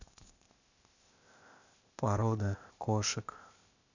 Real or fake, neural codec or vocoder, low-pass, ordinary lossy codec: fake; codec, 16 kHz, 0.8 kbps, ZipCodec; 7.2 kHz; none